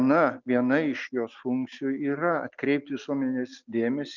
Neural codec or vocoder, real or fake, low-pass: none; real; 7.2 kHz